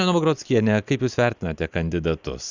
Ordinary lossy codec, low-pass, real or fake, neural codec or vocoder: Opus, 64 kbps; 7.2 kHz; real; none